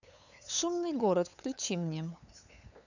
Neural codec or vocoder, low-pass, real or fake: codec, 16 kHz, 8 kbps, FunCodec, trained on LibriTTS, 25 frames a second; 7.2 kHz; fake